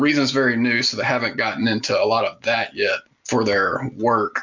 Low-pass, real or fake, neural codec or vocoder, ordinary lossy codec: 7.2 kHz; real; none; MP3, 64 kbps